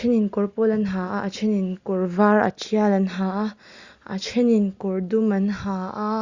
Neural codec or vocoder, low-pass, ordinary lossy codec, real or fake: none; 7.2 kHz; Opus, 64 kbps; real